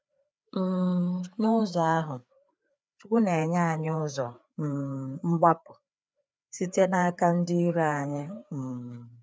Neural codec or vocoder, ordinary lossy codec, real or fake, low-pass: codec, 16 kHz, 4 kbps, FreqCodec, larger model; none; fake; none